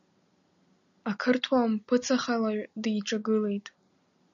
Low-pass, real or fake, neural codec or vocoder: 7.2 kHz; real; none